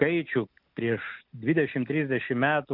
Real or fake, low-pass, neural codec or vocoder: real; 5.4 kHz; none